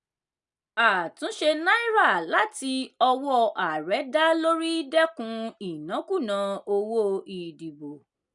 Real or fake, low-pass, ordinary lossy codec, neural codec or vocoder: real; 10.8 kHz; none; none